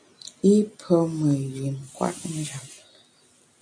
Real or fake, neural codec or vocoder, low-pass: real; none; 9.9 kHz